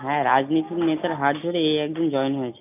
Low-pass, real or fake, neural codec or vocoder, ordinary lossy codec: 3.6 kHz; real; none; none